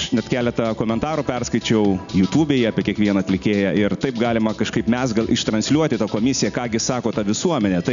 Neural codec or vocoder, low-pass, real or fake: none; 7.2 kHz; real